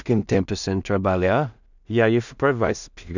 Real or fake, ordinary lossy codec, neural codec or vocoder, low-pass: fake; none; codec, 16 kHz in and 24 kHz out, 0.4 kbps, LongCat-Audio-Codec, two codebook decoder; 7.2 kHz